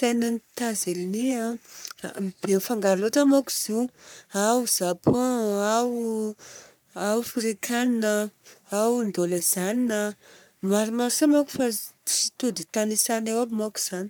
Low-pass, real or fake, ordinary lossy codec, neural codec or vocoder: none; fake; none; codec, 44.1 kHz, 3.4 kbps, Pupu-Codec